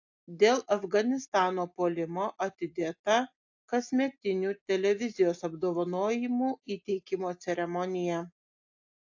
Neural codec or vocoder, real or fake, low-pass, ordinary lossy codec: none; real; 7.2 kHz; AAC, 48 kbps